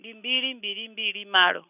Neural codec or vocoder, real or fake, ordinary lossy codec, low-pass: none; real; none; 3.6 kHz